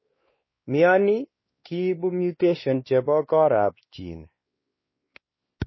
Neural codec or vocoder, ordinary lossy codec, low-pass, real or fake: codec, 16 kHz, 2 kbps, X-Codec, WavLM features, trained on Multilingual LibriSpeech; MP3, 24 kbps; 7.2 kHz; fake